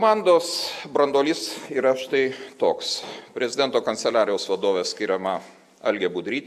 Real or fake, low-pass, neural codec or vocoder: real; 14.4 kHz; none